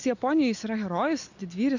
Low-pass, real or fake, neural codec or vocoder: 7.2 kHz; real; none